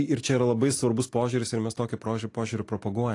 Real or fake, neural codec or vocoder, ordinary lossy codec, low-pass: real; none; AAC, 48 kbps; 10.8 kHz